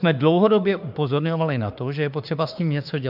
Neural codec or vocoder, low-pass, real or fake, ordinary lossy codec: autoencoder, 48 kHz, 32 numbers a frame, DAC-VAE, trained on Japanese speech; 5.4 kHz; fake; AAC, 48 kbps